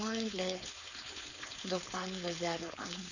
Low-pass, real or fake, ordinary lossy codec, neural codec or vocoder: 7.2 kHz; fake; none; codec, 16 kHz, 4.8 kbps, FACodec